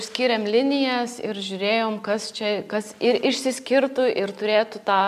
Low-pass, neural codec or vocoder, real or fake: 14.4 kHz; none; real